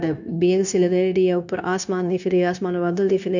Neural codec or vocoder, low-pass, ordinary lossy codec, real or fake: codec, 16 kHz, 0.9 kbps, LongCat-Audio-Codec; 7.2 kHz; none; fake